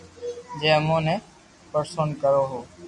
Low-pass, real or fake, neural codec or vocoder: 10.8 kHz; real; none